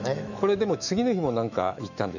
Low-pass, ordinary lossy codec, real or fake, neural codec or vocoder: 7.2 kHz; none; fake; autoencoder, 48 kHz, 128 numbers a frame, DAC-VAE, trained on Japanese speech